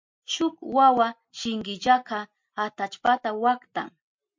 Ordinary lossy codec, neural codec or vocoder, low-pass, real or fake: MP3, 48 kbps; none; 7.2 kHz; real